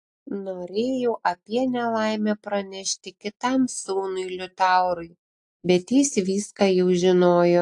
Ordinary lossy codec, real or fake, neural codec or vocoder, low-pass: AAC, 64 kbps; real; none; 10.8 kHz